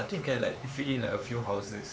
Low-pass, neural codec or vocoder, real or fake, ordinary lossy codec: none; codec, 16 kHz, 4 kbps, X-Codec, HuBERT features, trained on LibriSpeech; fake; none